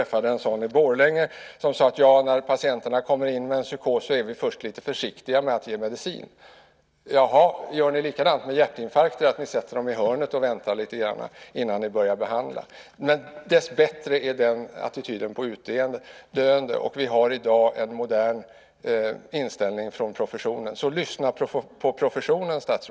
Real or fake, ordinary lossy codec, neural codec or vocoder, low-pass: real; none; none; none